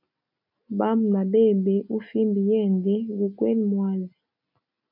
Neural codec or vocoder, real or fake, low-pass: none; real; 5.4 kHz